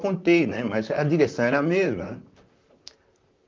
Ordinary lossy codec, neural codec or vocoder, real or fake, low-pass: Opus, 24 kbps; vocoder, 44.1 kHz, 128 mel bands, Pupu-Vocoder; fake; 7.2 kHz